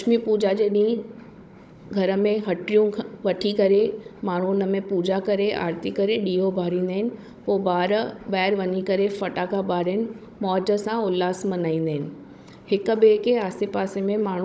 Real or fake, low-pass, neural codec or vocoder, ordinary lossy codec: fake; none; codec, 16 kHz, 16 kbps, FunCodec, trained on Chinese and English, 50 frames a second; none